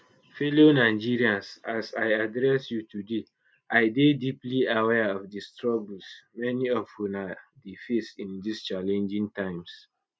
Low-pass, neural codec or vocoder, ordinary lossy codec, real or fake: none; none; none; real